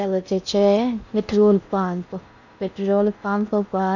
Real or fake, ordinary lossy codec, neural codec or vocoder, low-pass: fake; none; codec, 16 kHz in and 24 kHz out, 0.6 kbps, FocalCodec, streaming, 4096 codes; 7.2 kHz